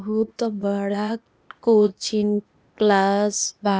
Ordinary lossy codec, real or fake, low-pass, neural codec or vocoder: none; fake; none; codec, 16 kHz, 0.8 kbps, ZipCodec